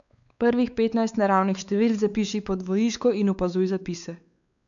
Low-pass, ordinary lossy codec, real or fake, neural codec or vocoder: 7.2 kHz; none; fake; codec, 16 kHz, 4 kbps, X-Codec, WavLM features, trained on Multilingual LibriSpeech